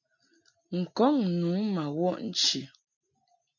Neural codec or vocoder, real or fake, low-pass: none; real; 7.2 kHz